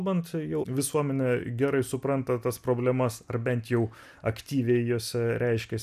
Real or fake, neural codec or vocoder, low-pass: real; none; 14.4 kHz